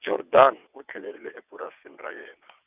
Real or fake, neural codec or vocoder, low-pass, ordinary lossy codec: fake; vocoder, 22.05 kHz, 80 mel bands, WaveNeXt; 3.6 kHz; none